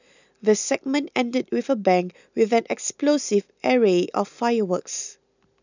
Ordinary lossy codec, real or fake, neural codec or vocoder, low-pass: none; real; none; 7.2 kHz